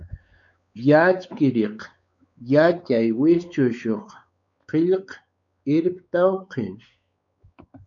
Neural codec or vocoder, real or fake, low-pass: codec, 16 kHz, 4 kbps, X-Codec, WavLM features, trained on Multilingual LibriSpeech; fake; 7.2 kHz